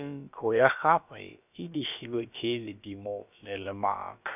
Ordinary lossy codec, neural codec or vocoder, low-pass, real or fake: none; codec, 16 kHz, about 1 kbps, DyCAST, with the encoder's durations; 3.6 kHz; fake